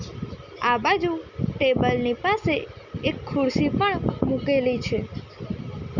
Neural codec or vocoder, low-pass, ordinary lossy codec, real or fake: none; 7.2 kHz; none; real